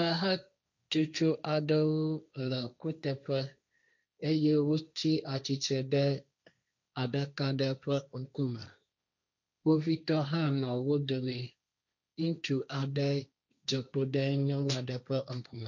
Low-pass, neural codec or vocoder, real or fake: 7.2 kHz; codec, 16 kHz, 1.1 kbps, Voila-Tokenizer; fake